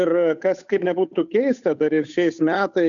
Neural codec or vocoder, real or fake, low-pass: codec, 16 kHz, 8 kbps, FunCodec, trained on Chinese and English, 25 frames a second; fake; 7.2 kHz